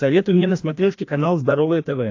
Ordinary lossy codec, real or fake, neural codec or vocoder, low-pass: AAC, 48 kbps; fake; codec, 16 kHz, 1 kbps, FreqCodec, larger model; 7.2 kHz